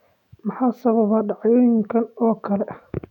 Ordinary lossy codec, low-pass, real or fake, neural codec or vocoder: none; 19.8 kHz; fake; autoencoder, 48 kHz, 128 numbers a frame, DAC-VAE, trained on Japanese speech